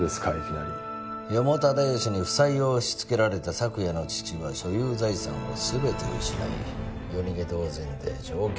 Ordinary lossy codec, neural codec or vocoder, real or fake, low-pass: none; none; real; none